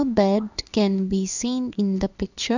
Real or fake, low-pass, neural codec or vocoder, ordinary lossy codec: fake; 7.2 kHz; codec, 16 kHz in and 24 kHz out, 1 kbps, XY-Tokenizer; none